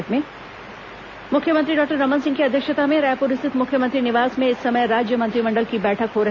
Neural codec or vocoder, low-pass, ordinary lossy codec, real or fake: none; 7.2 kHz; none; real